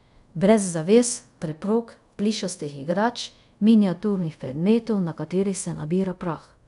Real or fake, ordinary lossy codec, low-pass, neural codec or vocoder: fake; none; 10.8 kHz; codec, 24 kHz, 0.5 kbps, DualCodec